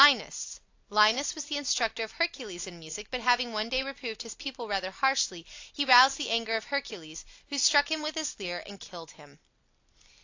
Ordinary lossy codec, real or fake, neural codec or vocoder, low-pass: AAC, 48 kbps; real; none; 7.2 kHz